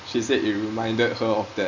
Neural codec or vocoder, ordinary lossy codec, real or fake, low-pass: none; none; real; 7.2 kHz